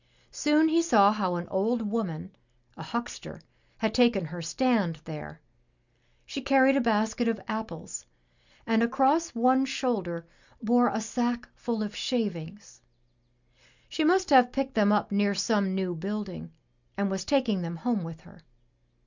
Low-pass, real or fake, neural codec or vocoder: 7.2 kHz; real; none